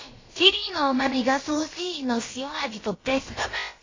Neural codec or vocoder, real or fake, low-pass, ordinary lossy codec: codec, 16 kHz, about 1 kbps, DyCAST, with the encoder's durations; fake; 7.2 kHz; AAC, 32 kbps